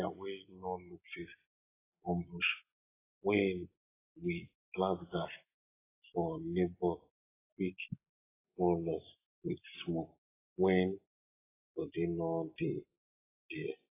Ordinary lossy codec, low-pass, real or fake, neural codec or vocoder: AAC, 16 kbps; 3.6 kHz; real; none